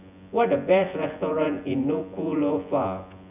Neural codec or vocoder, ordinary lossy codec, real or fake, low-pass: vocoder, 24 kHz, 100 mel bands, Vocos; none; fake; 3.6 kHz